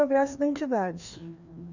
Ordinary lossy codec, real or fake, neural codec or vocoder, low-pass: none; fake; codec, 16 kHz, 2 kbps, FreqCodec, larger model; 7.2 kHz